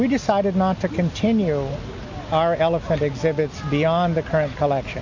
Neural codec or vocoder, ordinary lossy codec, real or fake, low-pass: none; AAC, 48 kbps; real; 7.2 kHz